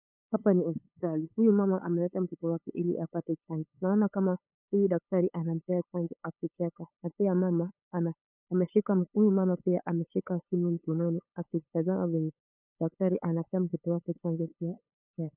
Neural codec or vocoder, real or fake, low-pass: codec, 16 kHz, 8 kbps, FunCodec, trained on LibriTTS, 25 frames a second; fake; 3.6 kHz